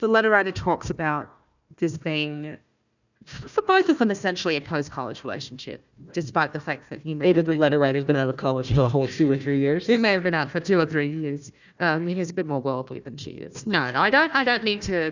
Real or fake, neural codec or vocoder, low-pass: fake; codec, 16 kHz, 1 kbps, FunCodec, trained on Chinese and English, 50 frames a second; 7.2 kHz